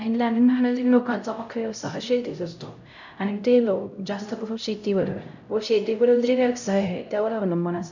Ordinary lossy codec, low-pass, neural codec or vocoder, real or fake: none; 7.2 kHz; codec, 16 kHz, 0.5 kbps, X-Codec, HuBERT features, trained on LibriSpeech; fake